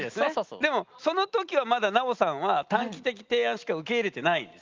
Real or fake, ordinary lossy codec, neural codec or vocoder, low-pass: real; Opus, 24 kbps; none; 7.2 kHz